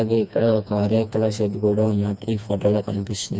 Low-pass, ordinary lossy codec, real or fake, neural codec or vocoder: none; none; fake; codec, 16 kHz, 2 kbps, FreqCodec, smaller model